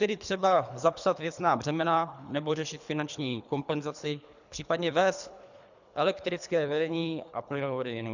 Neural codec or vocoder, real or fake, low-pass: codec, 24 kHz, 3 kbps, HILCodec; fake; 7.2 kHz